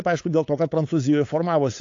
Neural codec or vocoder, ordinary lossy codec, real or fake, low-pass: codec, 16 kHz, 8 kbps, FunCodec, trained on LibriTTS, 25 frames a second; AAC, 48 kbps; fake; 7.2 kHz